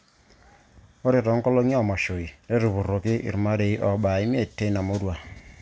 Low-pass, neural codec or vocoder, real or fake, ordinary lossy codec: none; none; real; none